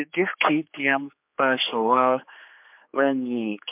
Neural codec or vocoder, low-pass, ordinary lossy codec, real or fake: codec, 16 kHz, 4 kbps, X-Codec, HuBERT features, trained on general audio; 3.6 kHz; MP3, 32 kbps; fake